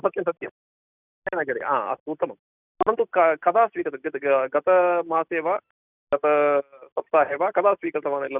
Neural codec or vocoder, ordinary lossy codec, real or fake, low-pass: none; none; real; 3.6 kHz